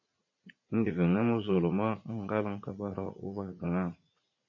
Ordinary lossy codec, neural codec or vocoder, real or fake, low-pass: MP3, 32 kbps; vocoder, 44.1 kHz, 80 mel bands, Vocos; fake; 7.2 kHz